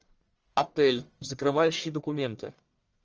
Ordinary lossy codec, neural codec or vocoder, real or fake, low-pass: Opus, 24 kbps; codec, 44.1 kHz, 1.7 kbps, Pupu-Codec; fake; 7.2 kHz